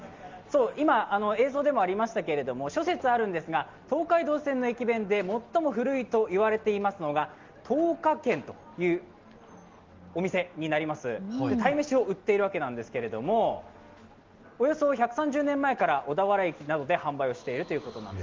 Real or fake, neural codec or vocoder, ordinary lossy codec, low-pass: real; none; Opus, 32 kbps; 7.2 kHz